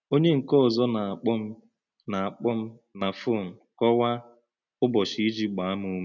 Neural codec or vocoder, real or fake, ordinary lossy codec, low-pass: none; real; none; 7.2 kHz